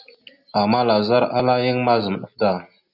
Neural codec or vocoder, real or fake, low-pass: none; real; 5.4 kHz